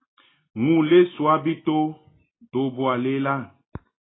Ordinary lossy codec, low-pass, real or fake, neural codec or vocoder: AAC, 16 kbps; 7.2 kHz; real; none